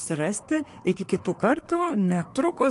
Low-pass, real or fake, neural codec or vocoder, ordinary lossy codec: 10.8 kHz; fake; codec, 24 kHz, 1 kbps, SNAC; AAC, 48 kbps